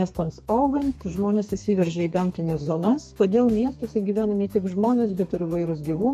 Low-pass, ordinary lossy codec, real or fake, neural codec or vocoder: 14.4 kHz; MP3, 64 kbps; fake; codec, 32 kHz, 1.9 kbps, SNAC